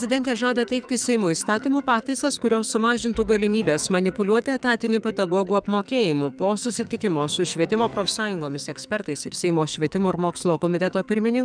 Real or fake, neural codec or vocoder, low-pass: fake; codec, 44.1 kHz, 2.6 kbps, SNAC; 9.9 kHz